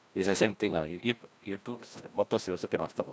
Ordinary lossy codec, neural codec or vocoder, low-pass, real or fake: none; codec, 16 kHz, 1 kbps, FreqCodec, larger model; none; fake